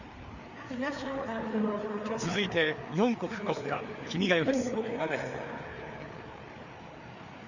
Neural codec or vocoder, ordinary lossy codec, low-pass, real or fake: codec, 16 kHz, 4 kbps, FreqCodec, larger model; none; 7.2 kHz; fake